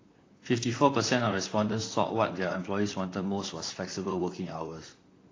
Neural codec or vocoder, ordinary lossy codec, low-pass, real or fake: vocoder, 44.1 kHz, 128 mel bands, Pupu-Vocoder; AAC, 32 kbps; 7.2 kHz; fake